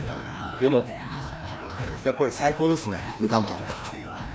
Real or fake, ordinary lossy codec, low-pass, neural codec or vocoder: fake; none; none; codec, 16 kHz, 1 kbps, FreqCodec, larger model